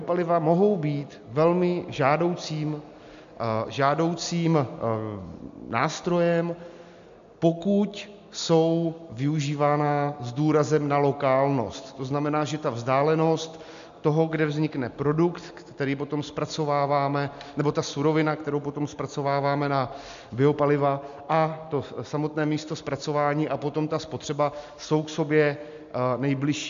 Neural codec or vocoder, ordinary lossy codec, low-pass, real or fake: none; MP3, 64 kbps; 7.2 kHz; real